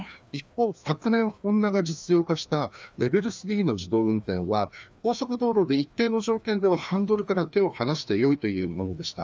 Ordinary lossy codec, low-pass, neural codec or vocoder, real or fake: none; none; codec, 16 kHz, 2 kbps, FreqCodec, larger model; fake